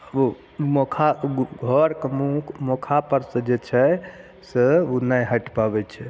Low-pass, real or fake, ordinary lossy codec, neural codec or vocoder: none; real; none; none